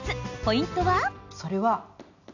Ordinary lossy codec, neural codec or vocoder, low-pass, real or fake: none; none; 7.2 kHz; real